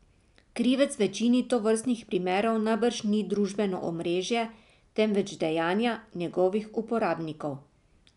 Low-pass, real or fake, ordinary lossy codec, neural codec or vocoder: 10.8 kHz; real; none; none